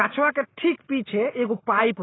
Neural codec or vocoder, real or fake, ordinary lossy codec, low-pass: none; real; AAC, 16 kbps; 7.2 kHz